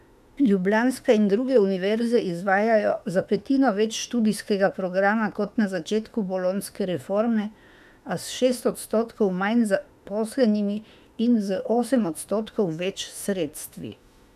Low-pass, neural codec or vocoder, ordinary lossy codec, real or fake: 14.4 kHz; autoencoder, 48 kHz, 32 numbers a frame, DAC-VAE, trained on Japanese speech; none; fake